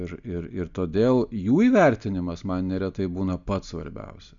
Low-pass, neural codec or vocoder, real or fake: 7.2 kHz; none; real